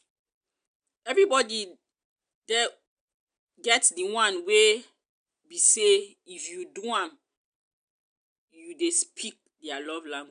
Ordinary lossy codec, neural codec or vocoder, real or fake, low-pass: none; none; real; 9.9 kHz